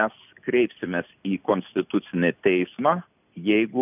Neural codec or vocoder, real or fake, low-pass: none; real; 3.6 kHz